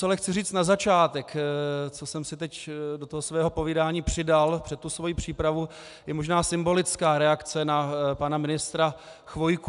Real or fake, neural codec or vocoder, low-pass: real; none; 10.8 kHz